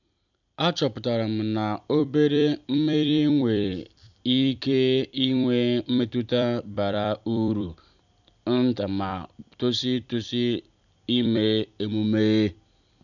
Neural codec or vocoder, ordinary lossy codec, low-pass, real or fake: vocoder, 44.1 kHz, 128 mel bands every 256 samples, BigVGAN v2; none; 7.2 kHz; fake